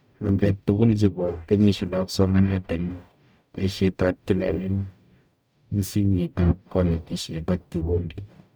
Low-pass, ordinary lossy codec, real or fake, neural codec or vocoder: none; none; fake; codec, 44.1 kHz, 0.9 kbps, DAC